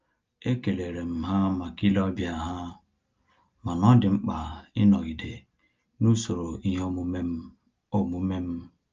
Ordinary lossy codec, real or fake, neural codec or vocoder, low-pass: Opus, 24 kbps; real; none; 7.2 kHz